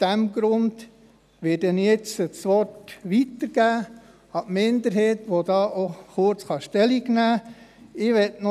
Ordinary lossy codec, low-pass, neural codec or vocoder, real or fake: none; 14.4 kHz; none; real